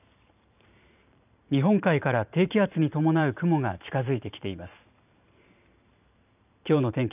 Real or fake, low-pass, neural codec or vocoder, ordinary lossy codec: fake; 3.6 kHz; vocoder, 44.1 kHz, 128 mel bands every 512 samples, BigVGAN v2; none